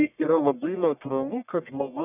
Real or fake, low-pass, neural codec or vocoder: fake; 3.6 kHz; codec, 44.1 kHz, 1.7 kbps, Pupu-Codec